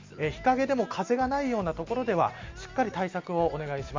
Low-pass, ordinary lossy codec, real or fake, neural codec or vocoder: 7.2 kHz; MP3, 64 kbps; real; none